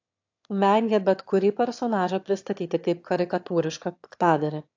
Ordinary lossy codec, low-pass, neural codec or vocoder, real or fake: AAC, 48 kbps; 7.2 kHz; autoencoder, 22.05 kHz, a latent of 192 numbers a frame, VITS, trained on one speaker; fake